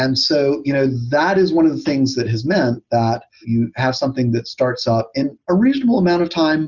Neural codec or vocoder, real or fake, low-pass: none; real; 7.2 kHz